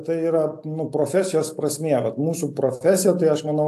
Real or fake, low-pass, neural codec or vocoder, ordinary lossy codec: real; 14.4 kHz; none; AAC, 64 kbps